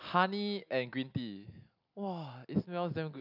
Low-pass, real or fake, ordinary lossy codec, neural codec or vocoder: 5.4 kHz; real; none; none